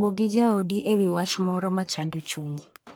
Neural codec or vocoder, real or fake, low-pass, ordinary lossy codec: codec, 44.1 kHz, 1.7 kbps, Pupu-Codec; fake; none; none